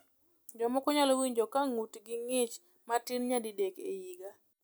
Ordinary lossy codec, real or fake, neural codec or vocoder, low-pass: none; real; none; none